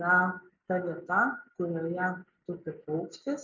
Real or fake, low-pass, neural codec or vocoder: real; 7.2 kHz; none